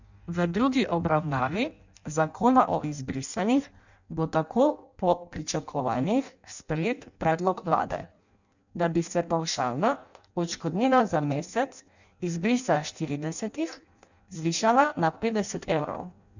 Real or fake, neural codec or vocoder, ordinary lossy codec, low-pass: fake; codec, 16 kHz in and 24 kHz out, 0.6 kbps, FireRedTTS-2 codec; none; 7.2 kHz